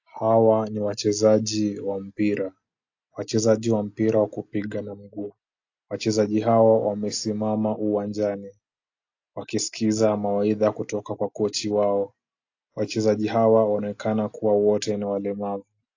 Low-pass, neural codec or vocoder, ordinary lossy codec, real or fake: 7.2 kHz; none; AAC, 48 kbps; real